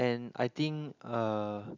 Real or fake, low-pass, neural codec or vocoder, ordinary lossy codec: real; 7.2 kHz; none; none